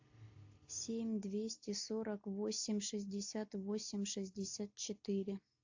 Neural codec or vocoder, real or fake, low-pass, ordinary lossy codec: none; real; 7.2 kHz; AAC, 48 kbps